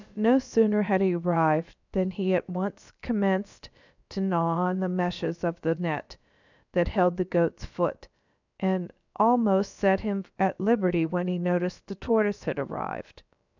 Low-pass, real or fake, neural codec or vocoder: 7.2 kHz; fake; codec, 16 kHz, about 1 kbps, DyCAST, with the encoder's durations